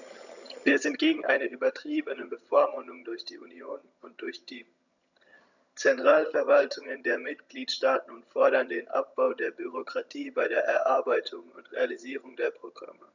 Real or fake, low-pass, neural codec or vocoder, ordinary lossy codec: fake; 7.2 kHz; vocoder, 22.05 kHz, 80 mel bands, HiFi-GAN; none